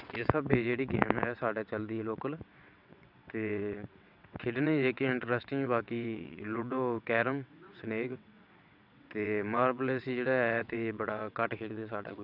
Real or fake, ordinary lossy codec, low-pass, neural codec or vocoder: fake; none; 5.4 kHz; vocoder, 22.05 kHz, 80 mel bands, WaveNeXt